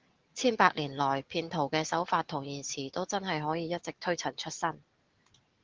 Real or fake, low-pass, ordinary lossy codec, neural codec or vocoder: real; 7.2 kHz; Opus, 16 kbps; none